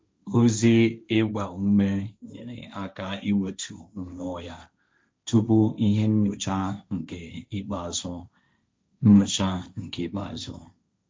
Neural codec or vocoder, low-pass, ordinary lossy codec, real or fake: codec, 16 kHz, 1.1 kbps, Voila-Tokenizer; none; none; fake